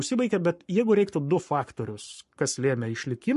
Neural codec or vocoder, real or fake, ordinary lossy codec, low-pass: codec, 44.1 kHz, 7.8 kbps, Pupu-Codec; fake; MP3, 48 kbps; 14.4 kHz